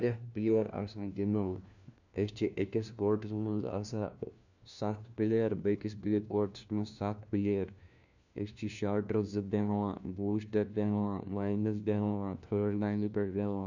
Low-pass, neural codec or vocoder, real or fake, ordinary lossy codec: 7.2 kHz; codec, 16 kHz, 1 kbps, FunCodec, trained on LibriTTS, 50 frames a second; fake; none